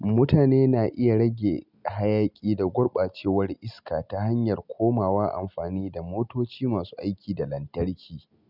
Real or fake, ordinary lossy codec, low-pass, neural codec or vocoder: real; none; 5.4 kHz; none